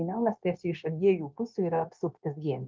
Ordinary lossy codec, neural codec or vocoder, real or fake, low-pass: Opus, 32 kbps; codec, 16 kHz, 0.9 kbps, LongCat-Audio-Codec; fake; 7.2 kHz